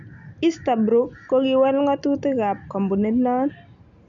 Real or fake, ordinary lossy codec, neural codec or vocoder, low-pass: real; none; none; 7.2 kHz